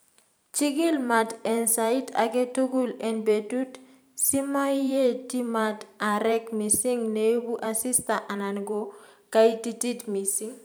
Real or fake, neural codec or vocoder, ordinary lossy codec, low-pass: fake; vocoder, 44.1 kHz, 128 mel bands every 512 samples, BigVGAN v2; none; none